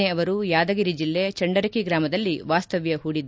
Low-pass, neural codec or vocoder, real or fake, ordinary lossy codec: none; none; real; none